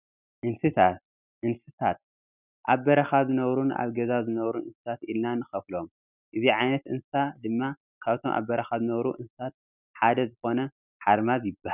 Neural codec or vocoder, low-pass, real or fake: none; 3.6 kHz; real